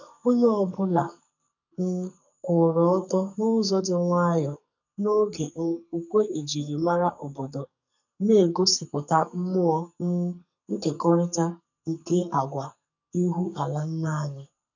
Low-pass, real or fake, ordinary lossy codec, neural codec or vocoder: 7.2 kHz; fake; none; codec, 44.1 kHz, 2.6 kbps, SNAC